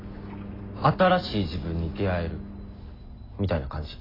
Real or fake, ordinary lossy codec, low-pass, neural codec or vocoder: real; AAC, 24 kbps; 5.4 kHz; none